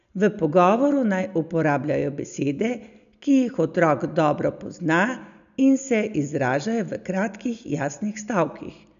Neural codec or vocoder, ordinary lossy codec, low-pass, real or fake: none; none; 7.2 kHz; real